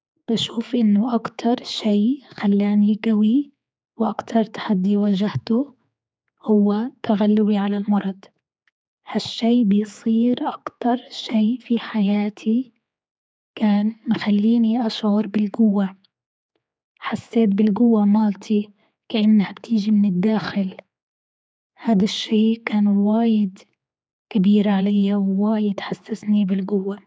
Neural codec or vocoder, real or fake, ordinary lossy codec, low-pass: codec, 16 kHz, 4 kbps, X-Codec, HuBERT features, trained on general audio; fake; none; none